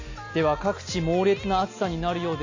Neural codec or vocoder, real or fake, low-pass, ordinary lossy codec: none; real; 7.2 kHz; AAC, 48 kbps